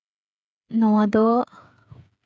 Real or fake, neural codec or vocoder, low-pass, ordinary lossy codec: fake; codec, 16 kHz, 8 kbps, FreqCodec, smaller model; none; none